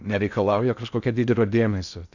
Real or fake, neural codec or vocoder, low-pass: fake; codec, 16 kHz in and 24 kHz out, 0.6 kbps, FocalCodec, streaming, 4096 codes; 7.2 kHz